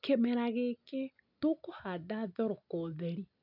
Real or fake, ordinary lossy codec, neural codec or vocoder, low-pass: real; none; none; 5.4 kHz